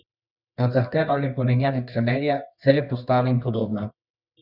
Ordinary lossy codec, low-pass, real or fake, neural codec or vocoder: none; 5.4 kHz; fake; codec, 24 kHz, 0.9 kbps, WavTokenizer, medium music audio release